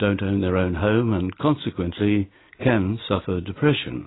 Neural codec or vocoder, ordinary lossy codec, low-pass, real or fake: none; AAC, 16 kbps; 7.2 kHz; real